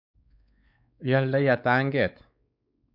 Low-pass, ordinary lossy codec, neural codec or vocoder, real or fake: 5.4 kHz; none; codec, 16 kHz, 4 kbps, X-Codec, WavLM features, trained on Multilingual LibriSpeech; fake